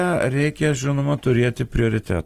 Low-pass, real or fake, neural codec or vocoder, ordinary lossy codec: 14.4 kHz; real; none; Opus, 24 kbps